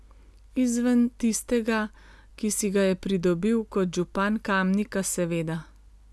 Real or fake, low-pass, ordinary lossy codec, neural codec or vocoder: real; none; none; none